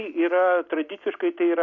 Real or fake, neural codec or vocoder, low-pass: real; none; 7.2 kHz